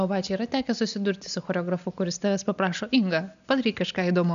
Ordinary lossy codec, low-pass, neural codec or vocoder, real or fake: MP3, 96 kbps; 7.2 kHz; none; real